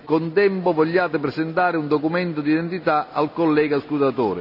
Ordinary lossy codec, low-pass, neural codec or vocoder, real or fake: none; 5.4 kHz; none; real